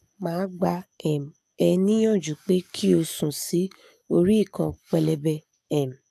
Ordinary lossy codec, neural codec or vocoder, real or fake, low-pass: none; codec, 44.1 kHz, 7.8 kbps, DAC; fake; 14.4 kHz